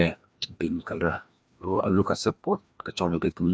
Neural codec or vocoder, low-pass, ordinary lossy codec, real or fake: codec, 16 kHz, 1 kbps, FreqCodec, larger model; none; none; fake